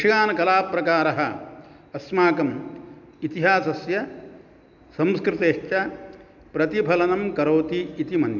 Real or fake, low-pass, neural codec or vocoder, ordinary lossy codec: real; 7.2 kHz; none; none